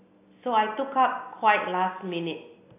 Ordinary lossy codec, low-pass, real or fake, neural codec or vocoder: AAC, 24 kbps; 3.6 kHz; real; none